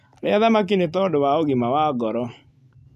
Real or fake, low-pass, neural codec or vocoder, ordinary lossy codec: real; 14.4 kHz; none; none